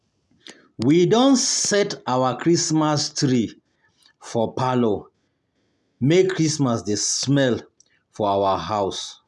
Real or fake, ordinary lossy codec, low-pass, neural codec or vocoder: real; none; none; none